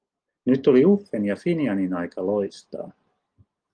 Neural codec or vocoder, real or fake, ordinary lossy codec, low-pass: none; real; Opus, 24 kbps; 9.9 kHz